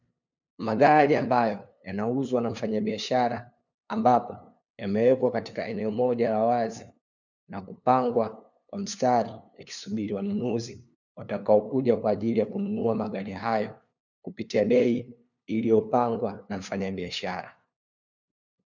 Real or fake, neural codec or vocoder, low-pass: fake; codec, 16 kHz, 2 kbps, FunCodec, trained on LibriTTS, 25 frames a second; 7.2 kHz